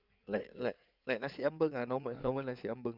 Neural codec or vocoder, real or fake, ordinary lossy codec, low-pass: codec, 16 kHz in and 24 kHz out, 2.2 kbps, FireRedTTS-2 codec; fake; none; 5.4 kHz